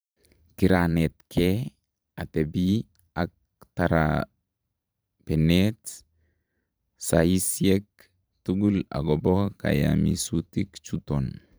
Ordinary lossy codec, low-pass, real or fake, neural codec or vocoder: none; none; fake; vocoder, 44.1 kHz, 128 mel bands every 512 samples, BigVGAN v2